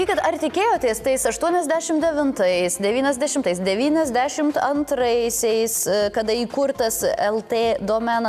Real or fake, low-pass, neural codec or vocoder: real; 14.4 kHz; none